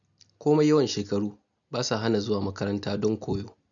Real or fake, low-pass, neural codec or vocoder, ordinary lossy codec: real; 7.2 kHz; none; none